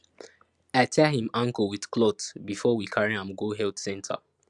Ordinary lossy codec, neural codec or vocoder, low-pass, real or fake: Opus, 64 kbps; none; 10.8 kHz; real